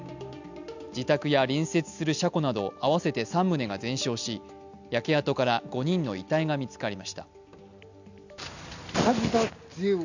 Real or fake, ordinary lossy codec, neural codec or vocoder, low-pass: real; none; none; 7.2 kHz